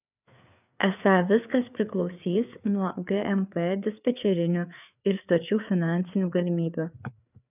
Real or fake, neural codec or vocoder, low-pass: fake; codec, 16 kHz, 4 kbps, FreqCodec, larger model; 3.6 kHz